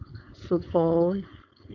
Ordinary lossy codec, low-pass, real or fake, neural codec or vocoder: none; 7.2 kHz; fake; codec, 16 kHz, 4.8 kbps, FACodec